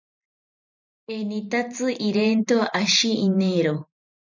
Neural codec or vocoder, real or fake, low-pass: vocoder, 44.1 kHz, 128 mel bands every 512 samples, BigVGAN v2; fake; 7.2 kHz